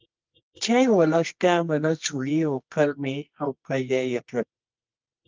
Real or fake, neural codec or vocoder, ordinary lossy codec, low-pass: fake; codec, 24 kHz, 0.9 kbps, WavTokenizer, medium music audio release; Opus, 24 kbps; 7.2 kHz